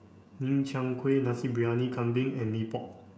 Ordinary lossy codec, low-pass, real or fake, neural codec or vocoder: none; none; fake; codec, 16 kHz, 16 kbps, FreqCodec, smaller model